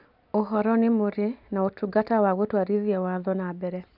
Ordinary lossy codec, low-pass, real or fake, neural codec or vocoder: none; 5.4 kHz; real; none